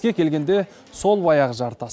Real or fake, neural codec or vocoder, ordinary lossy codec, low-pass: real; none; none; none